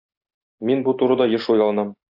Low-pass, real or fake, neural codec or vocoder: 5.4 kHz; real; none